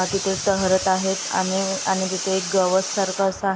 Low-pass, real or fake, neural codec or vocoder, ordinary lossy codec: none; real; none; none